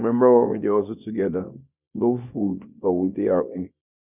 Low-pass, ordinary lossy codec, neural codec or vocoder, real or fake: 3.6 kHz; none; codec, 24 kHz, 0.9 kbps, WavTokenizer, small release; fake